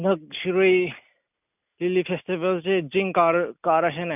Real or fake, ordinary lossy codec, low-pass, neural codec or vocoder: real; none; 3.6 kHz; none